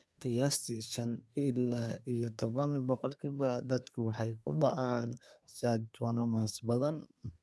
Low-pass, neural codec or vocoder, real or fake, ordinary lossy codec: none; codec, 24 kHz, 1 kbps, SNAC; fake; none